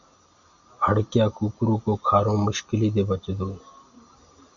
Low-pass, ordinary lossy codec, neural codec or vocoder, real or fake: 7.2 kHz; Opus, 64 kbps; none; real